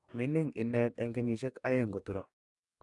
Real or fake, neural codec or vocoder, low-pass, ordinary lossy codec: fake; codec, 44.1 kHz, 2.6 kbps, DAC; 10.8 kHz; none